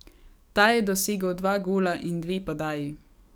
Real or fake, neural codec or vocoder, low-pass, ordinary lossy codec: fake; codec, 44.1 kHz, 7.8 kbps, DAC; none; none